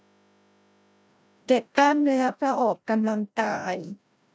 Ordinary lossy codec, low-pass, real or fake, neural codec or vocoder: none; none; fake; codec, 16 kHz, 0.5 kbps, FreqCodec, larger model